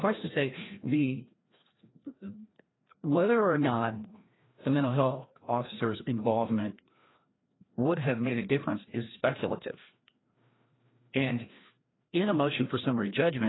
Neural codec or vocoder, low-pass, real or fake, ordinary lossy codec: codec, 16 kHz, 1 kbps, FreqCodec, larger model; 7.2 kHz; fake; AAC, 16 kbps